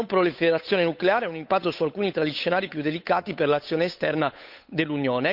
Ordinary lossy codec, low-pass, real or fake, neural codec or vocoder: none; 5.4 kHz; fake; codec, 16 kHz, 8 kbps, FunCodec, trained on Chinese and English, 25 frames a second